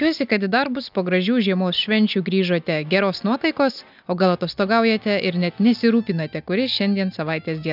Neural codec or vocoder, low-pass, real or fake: none; 5.4 kHz; real